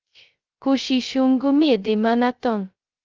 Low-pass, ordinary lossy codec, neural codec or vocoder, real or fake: 7.2 kHz; Opus, 24 kbps; codec, 16 kHz, 0.2 kbps, FocalCodec; fake